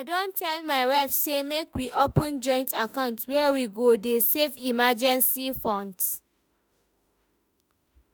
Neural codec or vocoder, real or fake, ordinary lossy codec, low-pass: autoencoder, 48 kHz, 32 numbers a frame, DAC-VAE, trained on Japanese speech; fake; none; none